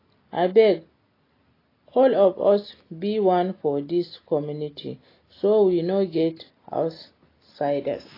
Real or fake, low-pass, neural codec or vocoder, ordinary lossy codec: real; 5.4 kHz; none; AAC, 24 kbps